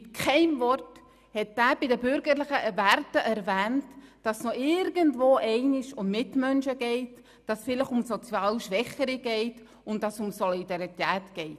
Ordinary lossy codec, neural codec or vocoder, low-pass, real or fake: none; none; 14.4 kHz; real